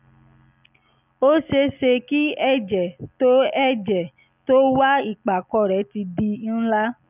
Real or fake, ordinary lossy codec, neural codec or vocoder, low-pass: real; none; none; 3.6 kHz